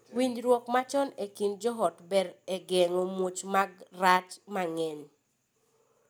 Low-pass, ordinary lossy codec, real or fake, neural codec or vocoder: none; none; real; none